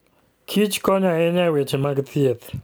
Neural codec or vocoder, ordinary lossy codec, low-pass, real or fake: none; none; none; real